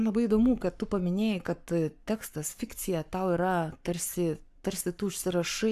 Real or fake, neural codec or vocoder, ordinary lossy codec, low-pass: fake; codec, 44.1 kHz, 7.8 kbps, Pupu-Codec; AAC, 96 kbps; 14.4 kHz